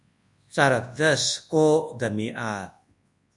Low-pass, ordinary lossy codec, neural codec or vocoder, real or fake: 10.8 kHz; AAC, 64 kbps; codec, 24 kHz, 0.9 kbps, WavTokenizer, large speech release; fake